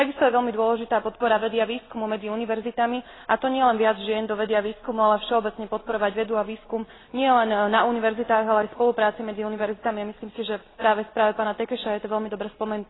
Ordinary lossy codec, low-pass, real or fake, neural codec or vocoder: AAC, 16 kbps; 7.2 kHz; real; none